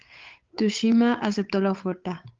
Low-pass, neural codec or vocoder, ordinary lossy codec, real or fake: 7.2 kHz; codec, 16 kHz, 8 kbps, FunCodec, trained on Chinese and English, 25 frames a second; Opus, 32 kbps; fake